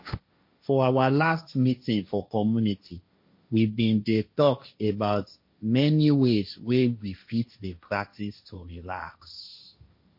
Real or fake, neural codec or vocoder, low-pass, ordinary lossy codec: fake; codec, 16 kHz, 1.1 kbps, Voila-Tokenizer; 5.4 kHz; MP3, 32 kbps